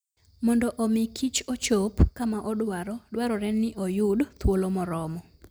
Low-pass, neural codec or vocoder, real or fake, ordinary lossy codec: none; none; real; none